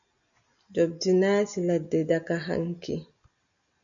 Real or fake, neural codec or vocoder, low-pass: real; none; 7.2 kHz